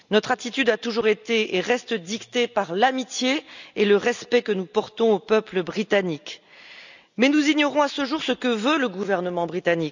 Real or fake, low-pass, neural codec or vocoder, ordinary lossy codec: real; 7.2 kHz; none; none